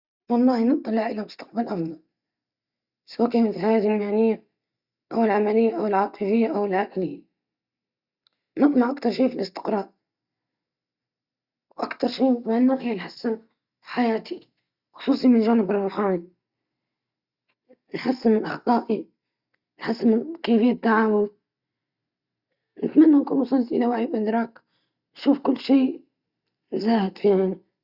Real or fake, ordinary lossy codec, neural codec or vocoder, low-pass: real; Opus, 64 kbps; none; 5.4 kHz